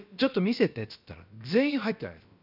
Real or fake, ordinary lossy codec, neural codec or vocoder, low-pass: fake; none; codec, 16 kHz, about 1 kbps, DyCAST, with the encoder's durations; 5.4 kHz